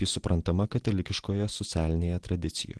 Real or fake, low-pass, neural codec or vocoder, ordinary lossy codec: real; 10.8 kHz; none; Opus, 16 kbps